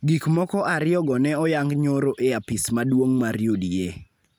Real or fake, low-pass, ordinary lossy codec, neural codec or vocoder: real; none; none; none